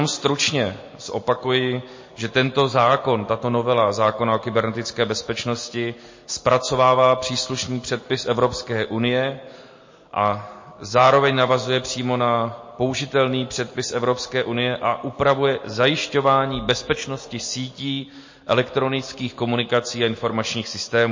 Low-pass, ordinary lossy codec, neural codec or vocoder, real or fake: 7.2 kHz; MP3, 32 kbps; none; real